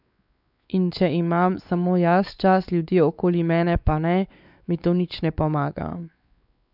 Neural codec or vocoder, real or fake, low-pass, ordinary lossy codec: codec, 16 kHz, 4 kbps, X-Codec, WavLM features, trained on Multilingual LibriSpeech; fake; 5.4 kHz; none